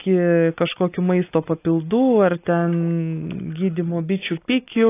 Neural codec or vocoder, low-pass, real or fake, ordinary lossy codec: none; 3.6 kHz; real; AAC, 24 kbps